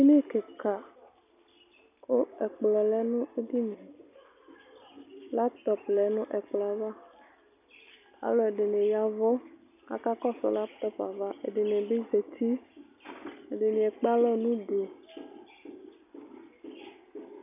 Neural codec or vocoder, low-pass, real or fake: none; 3.6 kHz; real